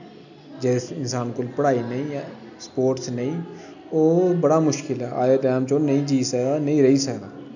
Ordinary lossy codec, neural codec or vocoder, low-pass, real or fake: none; none; 7.2 kHz; real